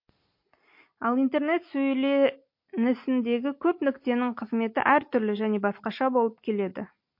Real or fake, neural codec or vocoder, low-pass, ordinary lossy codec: real; none; 5.4 kHz; MP3, 32 kbps